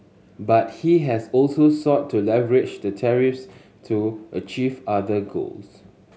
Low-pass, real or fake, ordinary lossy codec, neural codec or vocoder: none; real; none; none